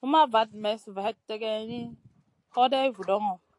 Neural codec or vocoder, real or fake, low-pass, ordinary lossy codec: none; real; 10.8 kHz; AAC, 48 kbps